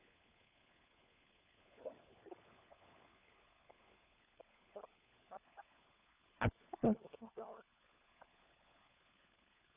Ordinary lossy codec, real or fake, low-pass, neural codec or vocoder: none; fake; 3.6 kHz; codec, 16 kHz, 4 kbps, FunCodec, trained on LibriTTS, 50 frames a second